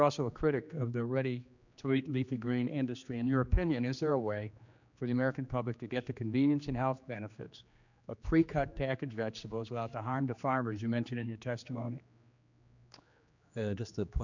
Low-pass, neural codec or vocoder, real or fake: 7.2 kHz; codec, 16 kHz, 2 kbps, X-Codec, HuBERT features, trained on general audio; fake